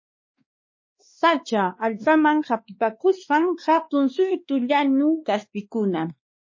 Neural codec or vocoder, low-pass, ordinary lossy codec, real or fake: codec, 16 kHz, 2 kbps, X-Codec, WavLM features, trained on Multilingual LibriSpeech; 7.2 kHz; MP3, 32 kbps; fake